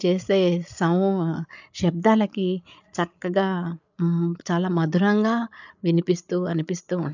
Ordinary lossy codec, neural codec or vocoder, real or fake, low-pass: none; codec, 16 kHz, 8 kbps, FreqCodec, larger model; fake; 7.2 kHz